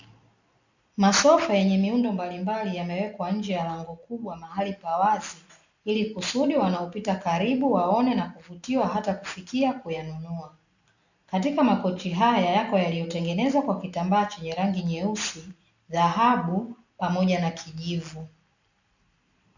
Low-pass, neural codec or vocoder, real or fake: 7.2 kHz; none; real